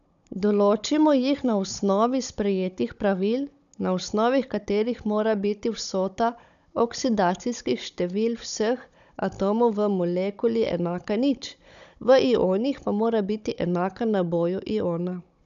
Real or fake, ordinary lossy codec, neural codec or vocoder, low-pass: fake; none; codec, 16 kHz, 16 kbps, FunCodec, trained on Chinese and English, 50 frames a second; 7.2 kHz